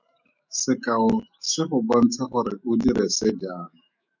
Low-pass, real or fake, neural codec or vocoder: 7.2 kHz; fake; autoencoder, 48 kHz, 128 numbers a frame, DAC-VAE, trained on Japanese speech